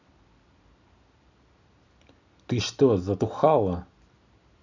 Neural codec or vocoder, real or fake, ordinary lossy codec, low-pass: none; real; none; 7.2 kHz